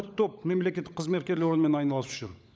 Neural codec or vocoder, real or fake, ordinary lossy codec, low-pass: codec, 16 kHz, 16 kbps, FunCodec, trained on LibriTTS, 50 frames a second; fake; none; none